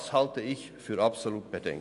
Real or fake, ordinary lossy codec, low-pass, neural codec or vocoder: real; none; 10.8 kHz; none